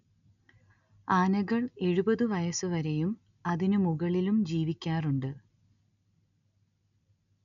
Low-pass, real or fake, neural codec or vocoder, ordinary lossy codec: 7.2 kHz; real; none; none